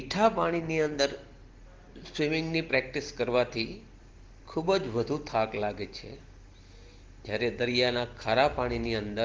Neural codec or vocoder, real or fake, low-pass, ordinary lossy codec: none; real; 7.2 kHz; Opus, 16 kbps